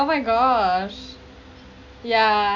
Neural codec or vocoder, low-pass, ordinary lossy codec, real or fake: none; 7.2 kHz; none; real